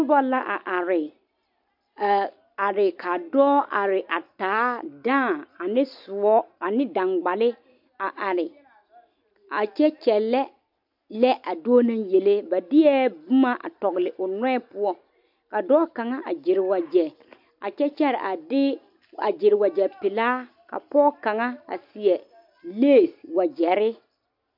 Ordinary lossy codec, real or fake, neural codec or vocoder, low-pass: MP3, 48 kbps; real; none; 5.4 kHz